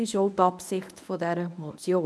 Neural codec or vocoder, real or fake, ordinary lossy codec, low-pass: codec, 24 kHz, 0.9 kbps, WavTokenizer, medium speech release version 1; fake; none; none